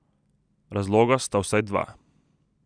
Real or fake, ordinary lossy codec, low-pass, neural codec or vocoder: real; none; 9.9 kHz; none